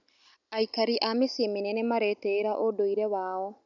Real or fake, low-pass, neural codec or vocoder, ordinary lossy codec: real; 7.2 kHz; none; none